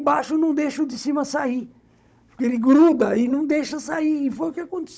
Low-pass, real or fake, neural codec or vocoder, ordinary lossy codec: none; fake; codec, 16 kHz, 16 kbps, FunCodec, trained on Chinese and English, 50 frames a second; none